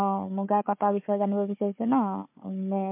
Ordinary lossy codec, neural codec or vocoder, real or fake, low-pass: MP3, 32 kbps; codec, 16 kHz, 4 kbps, FunCodec, trained on Chinese and English, 50 frames a second; fake; 3.6 kHz